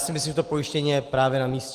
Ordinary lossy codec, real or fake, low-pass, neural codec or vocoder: Opus, 24 kbps; real; 14.4 kHz; none